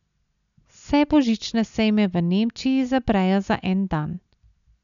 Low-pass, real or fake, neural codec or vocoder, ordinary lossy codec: 7.2 kHz; real; none; none